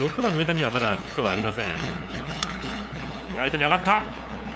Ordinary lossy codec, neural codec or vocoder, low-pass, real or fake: none; codec, 16 kHz, 2 kbps, FunCodec, trained on LibriTTS, 25 frames a second; none; fake